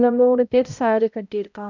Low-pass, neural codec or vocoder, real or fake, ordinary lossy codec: 7.2 kHz; codec, 16 kHz, 0.5 kbps, X-Codec, HuBERT features, trained on balanced general audio; fake; none